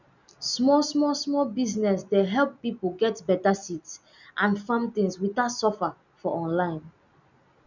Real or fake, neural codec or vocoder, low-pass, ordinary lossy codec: real; none; 7.2 kHz; none